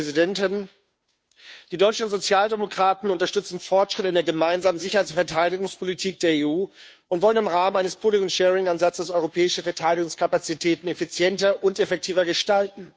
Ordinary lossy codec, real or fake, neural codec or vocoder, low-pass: none; fake; codec, 16 kHz, 2 kbps, FunCodec, trained on Chinese and English, 25 frames a second; none